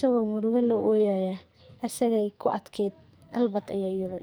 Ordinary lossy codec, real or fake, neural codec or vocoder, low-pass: none; fake; codec, 44.1 kHz, 2.6 kbps, SNAC; none